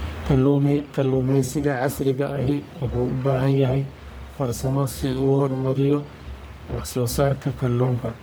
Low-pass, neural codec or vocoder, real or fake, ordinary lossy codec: none; codec, 44.1 kHz, 1.7 kbps, Pupu-Codec; fake; none